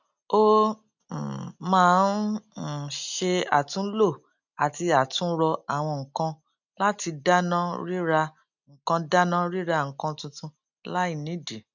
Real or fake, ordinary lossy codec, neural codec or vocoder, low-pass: real; none; none; 7.2 kHz